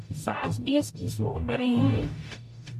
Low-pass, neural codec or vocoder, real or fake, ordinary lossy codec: 14.4 kHz; codec, 44.1 kHz, 0.9 kbps, DAC; fake; MP3, 64 kbps